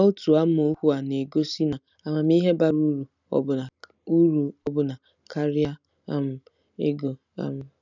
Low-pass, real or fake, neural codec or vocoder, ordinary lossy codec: 7.2 kHz; real; none; none